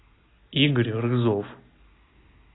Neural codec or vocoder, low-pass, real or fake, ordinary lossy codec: none; 7.2 kHz; real; AAC, 16 kbps